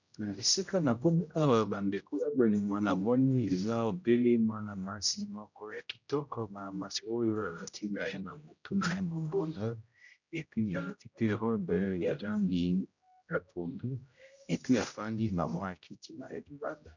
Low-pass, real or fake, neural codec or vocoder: 7.2 kHz; fake; codec, 16 kHz, 0.5 kbps, X-Codec, HuBERT features, trained on general audio